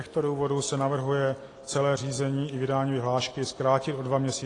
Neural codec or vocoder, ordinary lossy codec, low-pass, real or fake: none; AAC, 32 kbps; 10.8 kHz; real